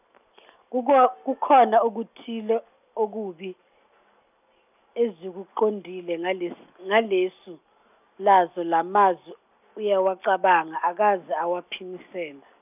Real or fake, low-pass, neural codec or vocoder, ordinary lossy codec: real; 3.6 kHz; none; none